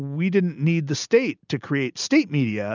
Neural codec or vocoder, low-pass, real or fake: none; 7.2 kHz; real